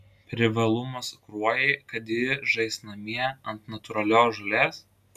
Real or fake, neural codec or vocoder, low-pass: real; none; 14.4 kHz